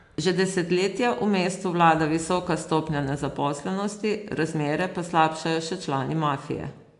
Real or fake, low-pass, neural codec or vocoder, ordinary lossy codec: real; 10.8 kHz; none; AAC, 64 kbps